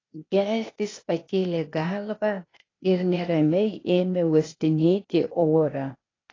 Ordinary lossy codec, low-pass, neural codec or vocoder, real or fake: AAC, 32 kbps; 7.2 kHz; codec, 16 kHz, 0.8 kbps, ZipCodec; fake